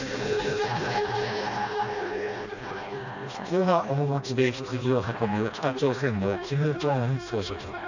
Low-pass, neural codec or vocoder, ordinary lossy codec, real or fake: 7.2 kHz; codec, 16 kHz, 1 kbps, FreqCodec, smaller model; none; fake